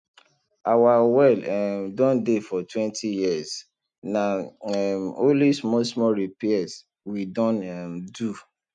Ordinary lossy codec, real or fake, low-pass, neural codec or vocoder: none; real; 9.9 kHz; none